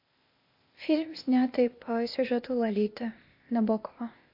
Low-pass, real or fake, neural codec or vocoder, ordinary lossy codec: 5.4 kHz; fake; codec, 16 kHz, 0.8 kbps, ZipCodec; MP3, 32 kbps